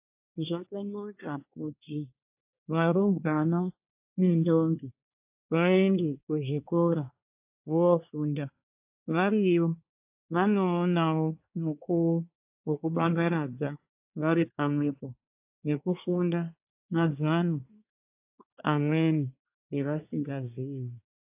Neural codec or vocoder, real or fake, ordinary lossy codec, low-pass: codec, 24 kHz, 1 kbps, SNAC; fake; AAC, 32 kbps; 3.6 kHz